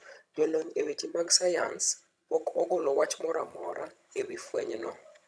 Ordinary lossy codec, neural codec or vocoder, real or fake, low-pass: none; vocoder, 22.05 kHz, 80 mel bands, HiFi-GAN; fake; none